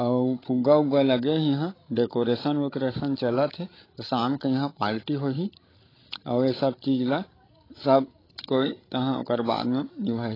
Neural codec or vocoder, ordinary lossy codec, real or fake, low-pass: codec, 16 kHz, 16 kbps, FreqCodec, larger model; AAC, 24 kbps; fake; 5.4 kHz